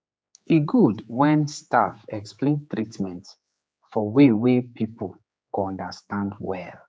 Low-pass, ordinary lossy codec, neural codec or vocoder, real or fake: none; none; codec, 16 kHz, 4 kbps, X-Codec, HuBERT features, trained on general audio; fake